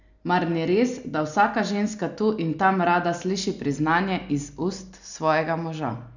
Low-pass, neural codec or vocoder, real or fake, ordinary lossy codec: 7.2 kHz; none; real; none